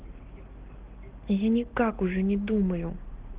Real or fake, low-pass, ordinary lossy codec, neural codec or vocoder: real; 3.6 kHz; Opus, 16 kbps; none